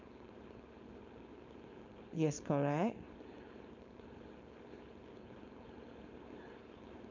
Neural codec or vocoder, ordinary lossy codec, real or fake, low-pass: codec, 16 kHz, 4.8 kbps, FACodec; none; fake; 7.2 kHz